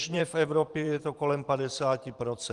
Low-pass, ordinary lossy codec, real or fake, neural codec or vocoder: 10.8 kHz; Opus, 24 kbps; fake; vocoder, 44.1 kHz, 128 mel bands every 512 samples, BigVGAN v2